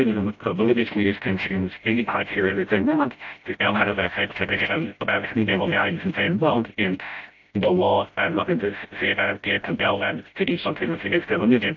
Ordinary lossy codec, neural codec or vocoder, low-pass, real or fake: AAC, 48 kbps; codec, 16 kHz, 0.5 kbps, FreqCodec, smaller model; 7.2 kHz; fake